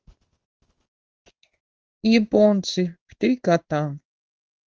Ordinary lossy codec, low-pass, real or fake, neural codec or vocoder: Opus, 24 kbps; 7.2 kHz; fake; codec, 16 kHz in and 24 kHz out, 1 kbps, XY-Tokenizer